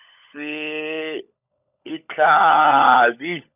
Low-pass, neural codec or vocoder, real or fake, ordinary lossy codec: 3.6 kHz; codec, 16 kHz, 16 kbps, FunCodec, trained on LibriTTS, 50 frames a second; fake; none